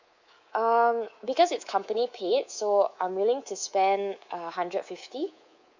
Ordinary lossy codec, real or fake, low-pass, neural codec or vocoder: Opus, 64 kbps; fake; 7.2 kHz; codec, 24 kHz, 3.1 kbps, DualCodec